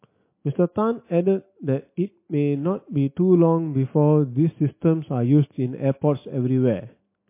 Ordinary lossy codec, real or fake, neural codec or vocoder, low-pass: MP3, 24 kbps; real; none; 3.6 kHz